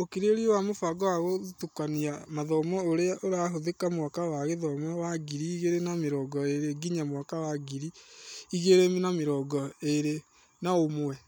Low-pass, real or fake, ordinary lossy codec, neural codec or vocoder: none; real; none; none